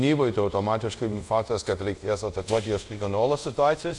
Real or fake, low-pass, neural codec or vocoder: fake; 10.8 kHz; codec, 24 kHz, 0.5 kbps, DualCodec